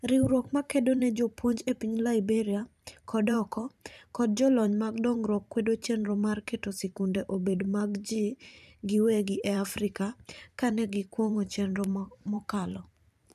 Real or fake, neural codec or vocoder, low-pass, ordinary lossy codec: fake; vocoder, 44.1 kHz, 128 mel bands every 512 samples, BigVGAN v2; 14.4 kHz; none